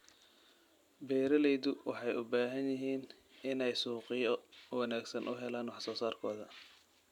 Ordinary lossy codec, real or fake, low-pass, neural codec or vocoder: none; real; 19.8 kHz; none